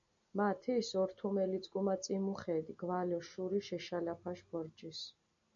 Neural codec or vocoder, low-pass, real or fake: none; 7.2 kHz; real